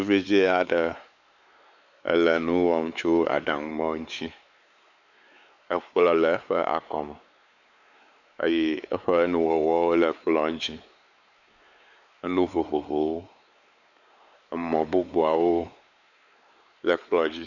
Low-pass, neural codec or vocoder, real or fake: 7.2 kHz; codec, 16 kHz, 4 kbps, X-Codec, WavLM features, trained on Multilingual LibriSpeech; fake